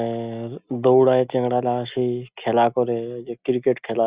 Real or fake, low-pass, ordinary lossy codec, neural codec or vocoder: real; 3.6 kHz; Opus, 64 kbps; none